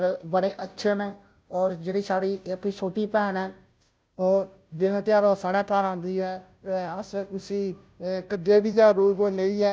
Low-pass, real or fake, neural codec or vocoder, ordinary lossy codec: none; fake; codec, 16 kHz, 0.5 kbps, FunCodec, trained on Chinese and English, 25 frames a second; none